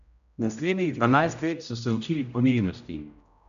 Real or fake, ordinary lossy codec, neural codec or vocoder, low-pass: fake; none; codec, 16 kHz, 0.5 kbps, X-Codec, HuBERT features, trained on general audio; 7.2 kHz